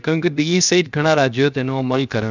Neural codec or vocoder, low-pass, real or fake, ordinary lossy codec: codec, 16 kHz, 0.7 kbps, FocalCodec; 7.2 kHz; fake; none